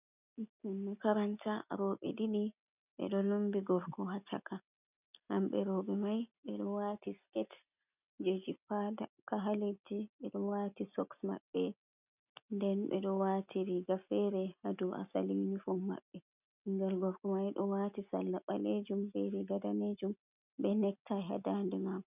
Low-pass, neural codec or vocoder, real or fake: 3.6 kHz; none; real